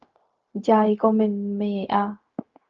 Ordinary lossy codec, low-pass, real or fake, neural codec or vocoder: Opus, 24 kbps; 7.2 kHz; fake; codec, 16 kHz, 0.4 kbps, LongCat-Audio-Codec